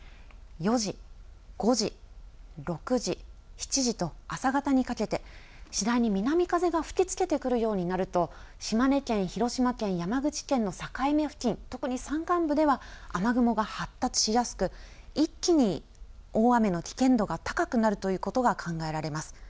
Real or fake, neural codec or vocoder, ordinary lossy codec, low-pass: real; none; none; none